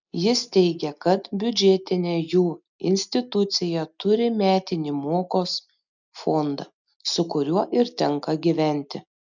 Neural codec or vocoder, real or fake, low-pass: none; real; 7.2 kHz